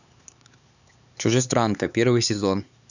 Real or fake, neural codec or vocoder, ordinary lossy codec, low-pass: fake; codec, 16 kHz, 4 kbps, X-Codec, HuBERT features, trained on LibriSpeech; none; 7.2 kHz